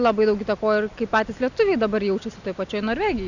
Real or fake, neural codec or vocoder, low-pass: real; none; 7.2 kHz